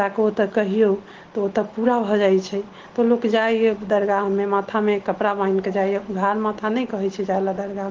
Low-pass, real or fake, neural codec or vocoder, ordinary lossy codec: 7.2 kHz; real; none; Opus, 16 kbps